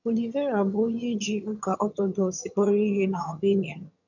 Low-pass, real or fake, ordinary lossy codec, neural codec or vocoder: 7.2 kHz; fake; none; vocoder, 22.05 kHz, 80 mel bands, HiFi-GAN